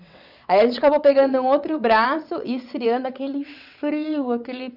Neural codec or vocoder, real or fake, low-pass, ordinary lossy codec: vocoder, 22.05 kHz, 80 mel bands, WaveNeXt; fake; 5.4 kHz; none